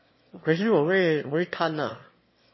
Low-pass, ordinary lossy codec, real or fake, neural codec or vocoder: 7.2 kHz; MP3, 24 kbps; fake; autoencoder, 22.05 kHz, a latent of 192 numbers a frame, VITS, trained on one speaker